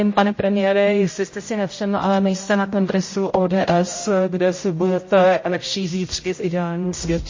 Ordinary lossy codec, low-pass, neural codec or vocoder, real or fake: MP3, 32 kbps; 7.2 kHz; codec, 16 kHz, 0.5 kbps, X-Codec, HuBERT features, trained on general audio; fake